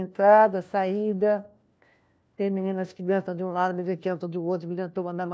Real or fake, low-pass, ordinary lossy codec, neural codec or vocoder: fake; none; none; codec, 16 kHz, 1 kbps, FunCodec, trained on LibriTTS, 50 frames a second